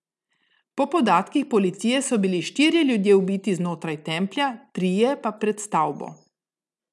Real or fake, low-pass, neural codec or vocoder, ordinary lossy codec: real; none; none; none